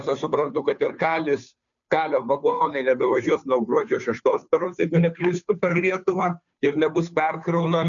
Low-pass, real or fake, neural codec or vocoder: 7.2 kHz; fake; codec, 16 kHz, 2 kbps, FunCodec, trained on Chinese and English, 25 frames a second